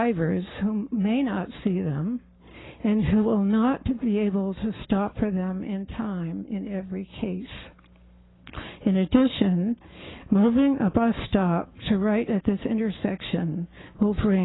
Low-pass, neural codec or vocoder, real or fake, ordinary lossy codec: 7.2 kHz; codec, 44.1 kHz, 7.8 kbps, Pupu-Codec; fake; AAC, 16 kbps